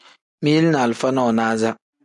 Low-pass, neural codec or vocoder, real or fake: 10.8 kHz; none; real